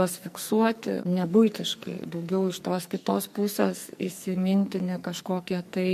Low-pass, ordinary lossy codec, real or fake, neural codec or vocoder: 14.4 kHz; MP3, 64 kbps; fake; codec, 44.1 kHz, 2.6 kbps, SNAC